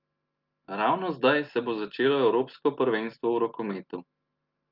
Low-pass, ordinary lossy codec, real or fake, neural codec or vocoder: 5.4 kHz; Opus, 24 kbps; real; none